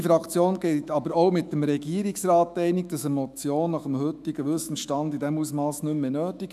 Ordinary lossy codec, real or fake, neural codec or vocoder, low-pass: none; fake; autoencoder, 48 kHz, 128 numbers a frame, DAC-VAE, trained on Japanese speech; 14.4 kHz